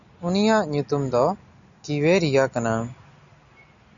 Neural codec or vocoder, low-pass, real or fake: none; 7.2 kHz; real